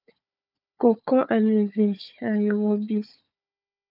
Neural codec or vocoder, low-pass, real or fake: codec, 16 kHz, 4 kbps, FunCodec, trained on Chinese and English, 50 frames a second; 5.4 kHz; fake